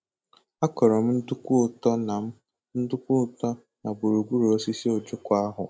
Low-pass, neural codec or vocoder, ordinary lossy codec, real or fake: none; none; none; real